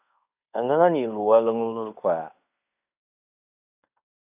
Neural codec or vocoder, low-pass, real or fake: codec, 16 kHz in and 24 kHz out, 0.9 kbps, LongCat-Audio-Codec, fine tuned four codebook decoder; 3.6 kHz; fake